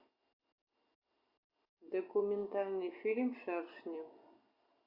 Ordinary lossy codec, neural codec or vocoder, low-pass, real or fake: Opus, 64 kbps; none; 5.4 kHz; real